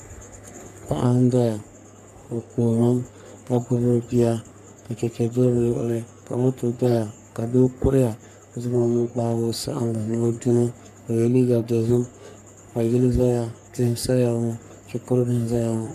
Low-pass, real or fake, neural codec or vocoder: 14.4 kHz; fake; codec, 44.1 kHz, 3.4 kbps, Pupu-Codec